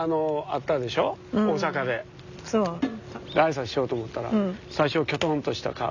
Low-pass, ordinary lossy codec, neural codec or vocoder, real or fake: 7.2 kHz; none; none; real